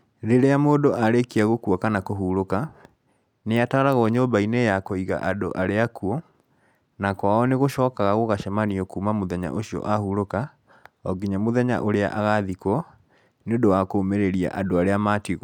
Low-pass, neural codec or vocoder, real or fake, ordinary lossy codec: 19.8 kHz; none; real; none